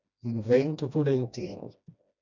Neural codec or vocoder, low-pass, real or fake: codec, 16 kHz, 1 kbps, FreqCodec, smaller model; 7.2 kHz; fake